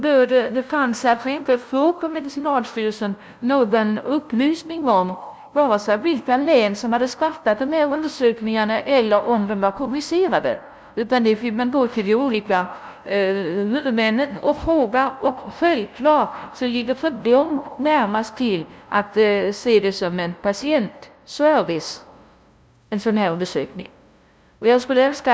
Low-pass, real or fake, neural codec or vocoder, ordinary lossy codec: none; fake; codec, 16 kHz, 0.5 kbps, FunCodec, trained on LibriTTS, 25 frames a second; none